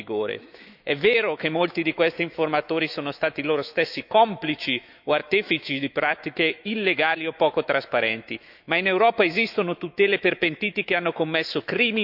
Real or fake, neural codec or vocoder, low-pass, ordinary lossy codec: fake; autoencoder, 48 kHz, 128 numbers a frame, DAC-VAE, trained on Japanese speech; 5.4 kHz; none